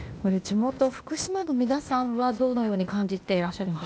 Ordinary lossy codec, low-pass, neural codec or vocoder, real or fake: none; none; codec, 16 kHz, 0.8 kbps, ZipCodec; fake